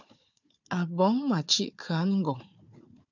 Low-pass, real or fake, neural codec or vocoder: 7.2 kHz; fake; codec, 16 kHz, 4 kbps, FunCodec, trained on Chinese and English, 50 frames a second